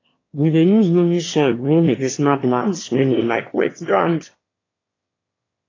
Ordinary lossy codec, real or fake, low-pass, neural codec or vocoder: AAC, 32 kbps; fake; 7.2 kHz; autoencoder, 22.05 kHz, a latent of 192 numbers a frame, VITS, trained on one speaker